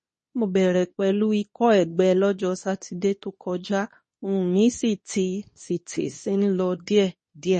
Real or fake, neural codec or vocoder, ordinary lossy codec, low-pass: fake; codec, 24 kHz, 0.9 kbps, WavTokenizer, medium speech release version 2; MP3, 32 kbps; 10.8 kHz